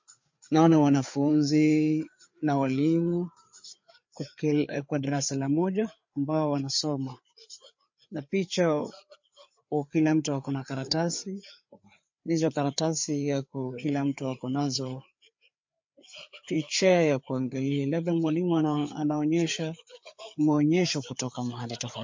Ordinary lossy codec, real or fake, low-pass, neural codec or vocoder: MP3, 48 kbps; fake; 7.2 kHz; codec, 16 kHz, 4 kbps, FreqCodec, larger model